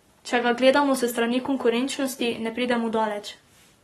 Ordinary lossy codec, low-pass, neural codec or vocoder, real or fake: AAC, 32 kbps; 19.8 kHz; codec, 44.1 kHz, 7.8 kbps, Pupu-Codec; fake